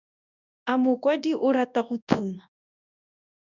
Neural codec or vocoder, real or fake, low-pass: codec, 24 kHz, 0.9 kbps, WavTokenizer, large speech release; fake; 7.2 kHz